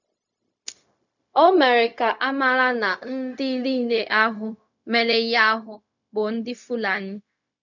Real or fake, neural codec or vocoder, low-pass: fake; codec, 16 kHz, 0.4 kbps, LongCat-Audio-Codec; 7.2 kHz